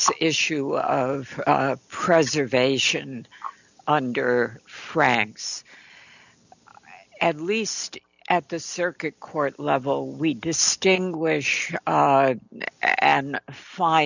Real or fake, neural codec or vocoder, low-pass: real; none; 7.2 kHz